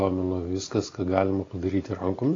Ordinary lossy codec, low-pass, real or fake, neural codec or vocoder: AAC, 32 kbps; 7.2 kHz; real; none